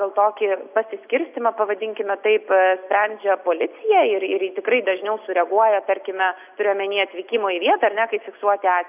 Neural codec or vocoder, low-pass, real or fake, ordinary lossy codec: none; 3.6 kHz; real; AAC, 32 kbps